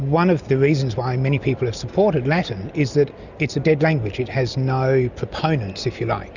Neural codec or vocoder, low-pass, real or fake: none; 7.2 kHz; real